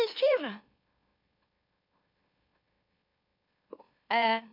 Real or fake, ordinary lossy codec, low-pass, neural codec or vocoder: fake; none; 5.4 kHz; autoencoder, 44.1 kHz, a latent of 192 numbers a frame, MeloTTS